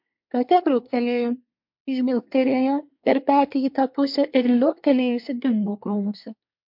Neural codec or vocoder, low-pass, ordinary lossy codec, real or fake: codec, 24 kHz, 1 kbps, SNAC; 5.4 kHz; MP3, 48 kbps; fake